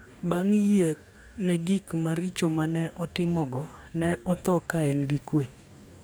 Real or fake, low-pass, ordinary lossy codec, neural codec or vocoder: fake; none; none; codec, 44.1 kHz, 2.6 kbps, DAC